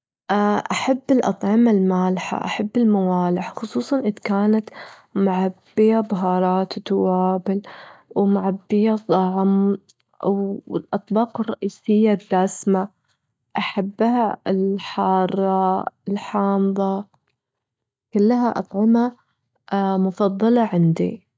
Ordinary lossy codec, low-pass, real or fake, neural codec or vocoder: none; none; real; none